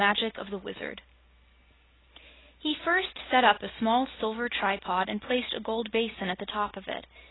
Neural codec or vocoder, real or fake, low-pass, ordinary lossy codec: none; real; 7.2 kHz; AAC, 16 kbps